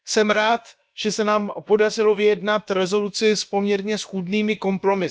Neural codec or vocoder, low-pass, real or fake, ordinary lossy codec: codec, 16 kHz, about 1 kbps, DyCAST, with the encoder's durations; none; fake; none